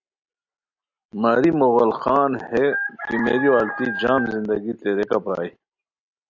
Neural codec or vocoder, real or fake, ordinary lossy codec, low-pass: none; real; AAC, 48 kbps; 7.2 kHz